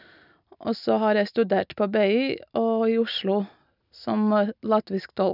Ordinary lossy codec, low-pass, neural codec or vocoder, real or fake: none; 5.4 kHz; none; real